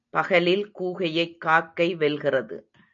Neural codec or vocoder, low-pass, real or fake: none; 7.2 kHz; real